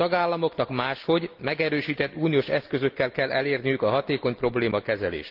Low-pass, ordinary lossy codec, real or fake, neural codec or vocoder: 5.4 kHz; Opus, 32 kbps; real; none